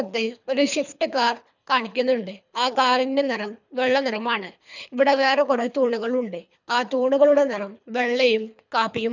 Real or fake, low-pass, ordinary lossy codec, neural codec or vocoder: fake; 7.2 kHz; none; codec, 24 kHz, 3 kbps, HILCodec